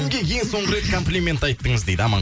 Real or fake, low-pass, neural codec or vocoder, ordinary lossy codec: real; none; none; none